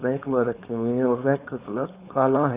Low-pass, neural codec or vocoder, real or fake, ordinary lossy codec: 3.6 kHz; codec, 16 kHz, 4.8 kbps, FACodec; fake; Opus, 64 kbps